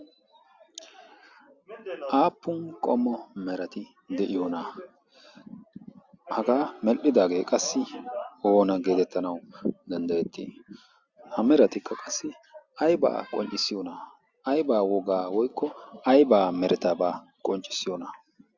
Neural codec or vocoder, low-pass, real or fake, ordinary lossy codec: none; 7.2 kHz; real; Opus, 64 kbps